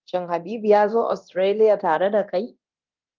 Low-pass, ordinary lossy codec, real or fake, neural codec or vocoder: 7.2 kHz; Opus, 24 kbps; fake; codec, 24 kHz, 0.9 kbps, DualCodec